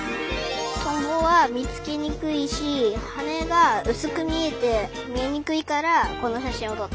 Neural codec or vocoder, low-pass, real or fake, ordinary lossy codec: none; none; real; none